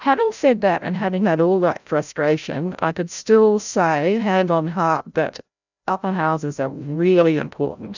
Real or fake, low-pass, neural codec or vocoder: fake; 7.2 kHz; codec, 16 kHz, 0.5 kbps, FreqCodec, larger model